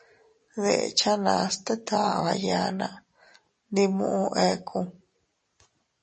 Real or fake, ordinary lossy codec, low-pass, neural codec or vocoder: real; MP3, 32 kbps; 10.8 kHz; none